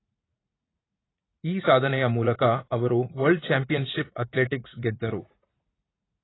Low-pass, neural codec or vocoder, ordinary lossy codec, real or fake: 7.2 kHz; vocoder, 44.1 kHz, 80 mel bands, Vocos; AAC, 16 kbps; fake